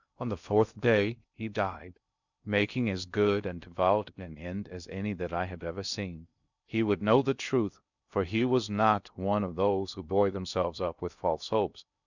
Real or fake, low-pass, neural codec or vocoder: fake; 7.2 kHz; codec, 16 kHz in and 24 kHz out, 0.6 kbps, FocalCodec, streaming, 2048 codes